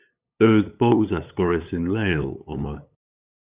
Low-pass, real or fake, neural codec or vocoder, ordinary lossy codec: 3.6 kHz; fake; codec, 16 kHz, 8 kbps, FunCodec, trained on LibriTTS, 25 frames a second; Opus, 64 kbps